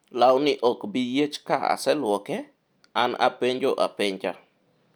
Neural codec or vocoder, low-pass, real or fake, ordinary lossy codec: none; none; real; none